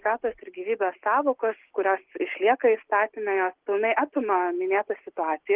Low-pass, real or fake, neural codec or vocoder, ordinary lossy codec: 3.6 kHz; real; none; Opus, 16 kbps